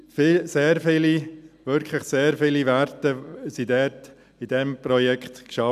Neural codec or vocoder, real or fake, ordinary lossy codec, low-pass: none; real; none; 14.4 kHz